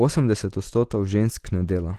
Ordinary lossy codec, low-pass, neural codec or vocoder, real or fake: Opus, 16 kbps; 14.4 kHz; none; real